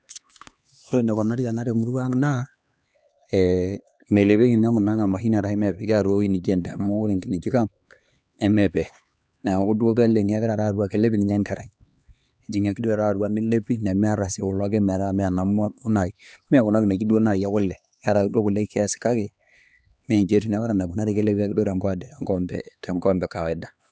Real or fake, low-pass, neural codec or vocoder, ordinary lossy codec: fake; none; codec, 16 kHz, 2 kbps, X-Codec, HuBERT features, trained on LibriSpeech; none